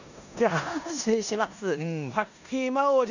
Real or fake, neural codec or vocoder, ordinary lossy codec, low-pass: fake; codec, 16 kHz in and 24 kHz out, 0.9 kbps, LongCat-Audio-Codec, four codebook decoder; none; 7.2 kHz